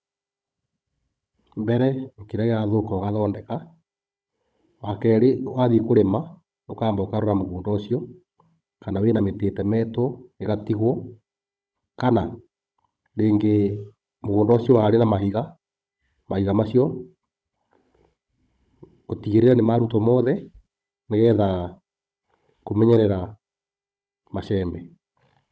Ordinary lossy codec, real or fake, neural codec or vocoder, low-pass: none; fake; codec, 16 kHz, 16 kbps, FunCodec, trained on Chinese and English, 50 frames a second; none